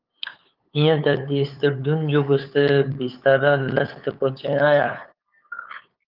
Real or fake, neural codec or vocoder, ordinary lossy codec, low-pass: fake; codec, 16 kHz, 8 kbps, FunCodec, trained on LibriTTS, 25 frames a second; Opus, 32 kbps; 5.4 kHz